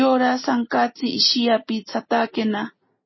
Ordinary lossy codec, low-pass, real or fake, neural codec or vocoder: MP3, 24 kbps; 7.2 kHz; real; none